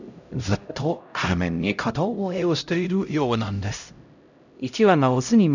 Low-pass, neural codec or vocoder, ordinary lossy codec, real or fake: 7.2 kHz; codec, 16 kHz, 0.5 kbps, X-Codec, HuBERT features, trained on LibriSpeech; none; fake